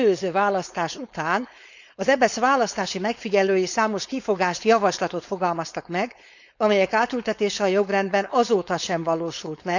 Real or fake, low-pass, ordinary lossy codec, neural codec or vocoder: fake; 7.2 kHz; none; codec, 16 kHz, 4.8 kbps, FACodec